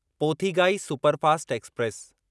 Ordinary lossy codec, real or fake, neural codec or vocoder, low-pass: none; real; none; none